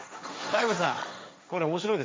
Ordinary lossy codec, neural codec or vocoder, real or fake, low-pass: none; codec, 16 kHz, 1.1 kbps, Voila-Tokenizer; fake; none